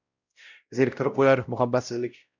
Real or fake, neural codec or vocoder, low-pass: fake; codec, 16 kHz, 0.5 kbps, X-Codec, WavLM features, trained on Multilingual LibriSpeech; 7.2 kHz